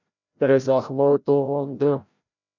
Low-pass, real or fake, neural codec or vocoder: 7.2 kHz; fake; codec, 16 kHz, 0.5 kbps, FreqCodec, larger model